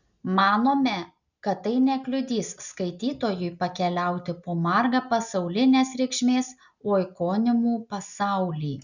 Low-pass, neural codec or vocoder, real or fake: 7.2 kHz; none; real